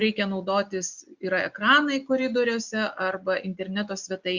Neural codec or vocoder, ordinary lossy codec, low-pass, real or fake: none; Opus, 64 kbps; 7.2 kHz; real